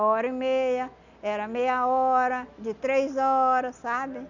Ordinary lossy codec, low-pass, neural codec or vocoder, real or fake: none; 7.2 kHz; none; real